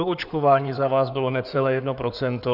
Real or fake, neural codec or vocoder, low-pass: fake; codec, 16 kHz in and 24 kHz out, 2.2 kbps, FireRedTTS-2 codec; 5.4 kHz